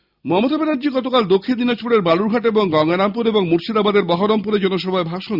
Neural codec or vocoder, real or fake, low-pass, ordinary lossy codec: none; real; 5.4 kHz; Opus, 64 kbps